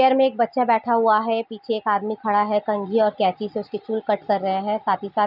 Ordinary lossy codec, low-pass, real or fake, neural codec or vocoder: none; 5.4 kHz; real; none